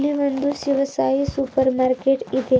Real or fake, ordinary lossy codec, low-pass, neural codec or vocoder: real; none; none; none